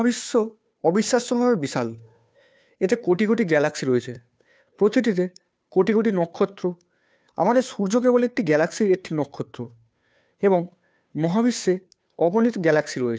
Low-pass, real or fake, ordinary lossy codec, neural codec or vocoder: none; fake; none; codec, 16 kHz, 2 kbps, FunCodec, trained on Chinese and English, 25 frames a second